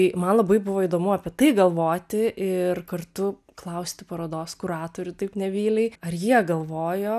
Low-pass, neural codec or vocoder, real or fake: 14.4 kHz; none; real